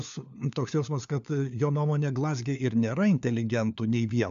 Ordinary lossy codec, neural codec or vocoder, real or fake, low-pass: AAC, 96 kbps; codec, 16 kHz, 4 kbps, FunCodec, trained on LibriTTS, 50 frames a second; fake; 7.2 kHz